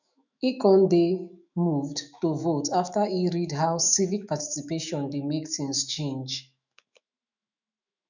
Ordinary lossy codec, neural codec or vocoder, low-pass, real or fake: none; autoencoder, 48 kHz, 128 numbers a frame, DAC-VAE, trained on Japanese speech; 7.2 kHz; fake